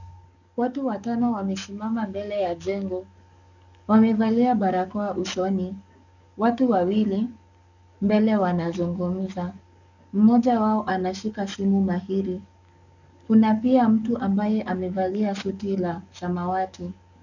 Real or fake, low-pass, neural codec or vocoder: fake; 7.2 kHz; codec, 44.1 kHz, 7.8 kbps, DAC